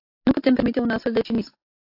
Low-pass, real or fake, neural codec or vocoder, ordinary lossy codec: 5.4 kHz; real; none; AAC, 32 kbps